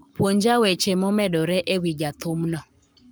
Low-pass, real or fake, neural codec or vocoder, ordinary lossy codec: none; fake; codec, 44.1 kHz, 7.8 kbps, Pupu-Codec; none